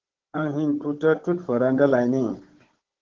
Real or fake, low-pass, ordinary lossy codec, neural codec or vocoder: fake; 7.2 kHz; Opus, 16 kbps; codec, 16 kHz, 16 kbps, FunCodec, trained on Chinese and English, 50 frames a second